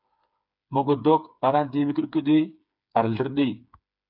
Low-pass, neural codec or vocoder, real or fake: 5.4 kHz; codec, 16 kHz, 4 kbps, FreqCodec, smaller model; fake